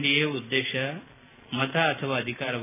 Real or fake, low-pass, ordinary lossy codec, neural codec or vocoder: real; 3.6 kHz; none; none